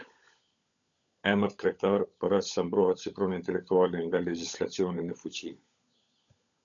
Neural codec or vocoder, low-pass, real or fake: codec, 16 kHz, 16 kbps, FunCodec, trained on LibriTTS, 50 frames a second; 7.2 kHz; fake